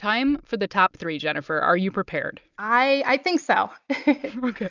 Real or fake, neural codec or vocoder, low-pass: real; none; 7.2 kHz